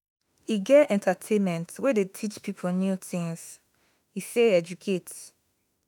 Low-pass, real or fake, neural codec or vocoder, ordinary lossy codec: none; fake; autoencoder, 48 kHz, 32 numbers a frame, DAC-VAE, trained on Japanese speech; none